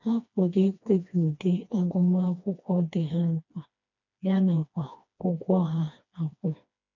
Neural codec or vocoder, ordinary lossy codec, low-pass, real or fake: codec, 16 kHz, 2 kbps, FreqCodec, smaller model; none; 7.2 kHz; fake